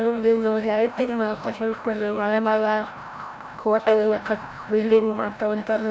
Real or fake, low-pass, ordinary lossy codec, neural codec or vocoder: fake; none; none; codec, 16 kHz, 0.5 kbps, FreqCodec, larger model